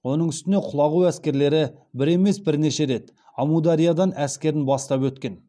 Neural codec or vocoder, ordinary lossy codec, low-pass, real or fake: none; none; none; real